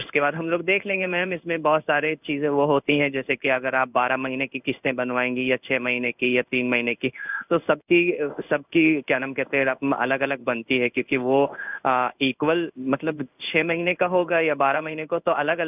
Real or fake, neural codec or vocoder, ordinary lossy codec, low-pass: fake; codec, 16 kHz in and 24 kHz out, 1 kbps, XY-Tokenizer; none; 3.6 kHz